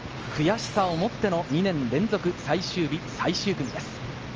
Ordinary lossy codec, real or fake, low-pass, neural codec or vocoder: Opus, 24 kbps; real; 7.2 kHz; none